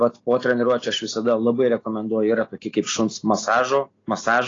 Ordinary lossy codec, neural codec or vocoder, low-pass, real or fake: AAC, 32 kbps; none; 7.2 kHz; real